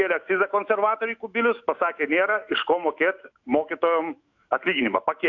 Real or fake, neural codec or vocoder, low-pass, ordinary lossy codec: fake; autoencoder, 48 kHz, 128 numbers a frame, DAC-VAE, trained on Japanese speech; 7.2 kHz; AAC, 48 kbps